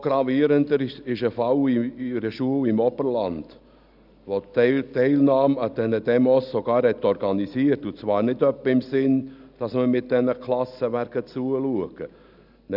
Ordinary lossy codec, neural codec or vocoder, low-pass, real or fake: none; none; 5.4 kHz; real